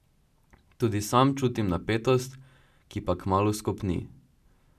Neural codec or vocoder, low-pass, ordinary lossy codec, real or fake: none; 14.4 kHz; none; real